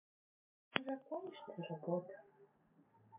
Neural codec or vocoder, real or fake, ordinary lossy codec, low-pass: none; real; MP3, 24 kbps; 3.6 kHz